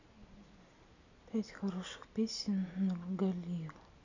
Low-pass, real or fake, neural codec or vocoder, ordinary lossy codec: 7.2 kHz; real; none; none